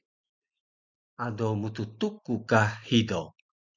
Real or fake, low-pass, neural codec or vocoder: real; 7.2 kHz; none